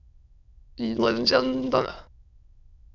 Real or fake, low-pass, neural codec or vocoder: fake; 7.2 kHz; autoencoder, 22.05 kHz, a latent of 192 numbers a frame, VITS, trained on many speakers